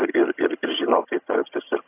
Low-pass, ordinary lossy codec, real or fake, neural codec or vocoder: 3.6 kHz; AAC, 24 kbps; fake; vocoder, 22.05 kHz, 80 mel bands, HiFi-GAN